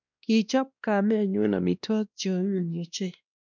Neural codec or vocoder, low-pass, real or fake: codec, 16 kHz, 1 kbps, X-Codec, WavLM features, trained on Multilingual LibriSpeech; 7.2 kHz; fake